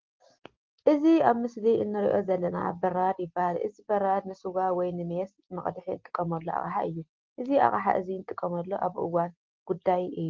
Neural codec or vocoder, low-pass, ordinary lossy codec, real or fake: none; 7.2 kHz; Opus, 16 kbps; real